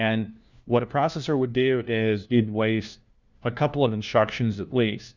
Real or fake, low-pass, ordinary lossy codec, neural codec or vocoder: fake; 7.2 kHz; Opus, 64 kbps; codec, 16 kHz, 1 kbps, FunCodec, trained on LibriTTS, 50 frames a second